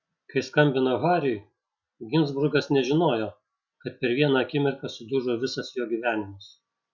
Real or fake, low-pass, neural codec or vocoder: real; 7.2 kHz; none